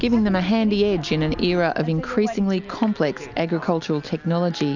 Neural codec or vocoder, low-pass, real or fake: none; 7.2 kHz; real